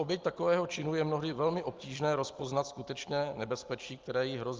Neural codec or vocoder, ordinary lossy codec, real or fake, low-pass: none; Opus, 32 kbps; real; 7.2 kHz